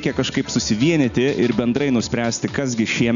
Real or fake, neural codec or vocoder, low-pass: real; none; 7.2 kHz